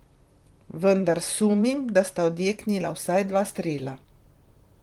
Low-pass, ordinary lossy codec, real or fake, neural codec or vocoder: 19.8 kHz; Opus, 24 kbps; fake; vocoder, 44.1 kHz, 128 mel bands, Pupu-Vocoder